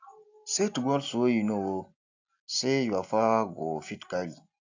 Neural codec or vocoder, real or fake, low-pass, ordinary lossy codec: none; real; 7.2 kHz; AAC, 48 kbps